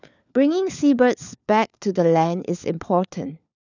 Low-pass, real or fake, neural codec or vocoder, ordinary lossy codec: 7.2 kHz; fake; codec, 16 kHz, 4 kbps, FunCodec, trained on LibriTTS, 50 frames a second; none